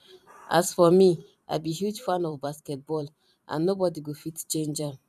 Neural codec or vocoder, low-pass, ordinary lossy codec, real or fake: vocoder, 44.1 kHz, 128 mel bands every 512 samples, BigVGAN v2; 14.4 kHz; none; fake